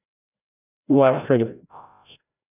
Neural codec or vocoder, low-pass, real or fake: codec, 16 kHz, 0.5 kbps, FreqCodec, larger model; 3.6 kHz; fake